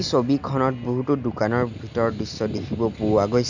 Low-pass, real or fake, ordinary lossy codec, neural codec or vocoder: 7.2 kHz; real; none; none